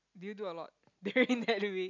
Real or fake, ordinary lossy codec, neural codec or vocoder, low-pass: real; none; none; 7.2 kHz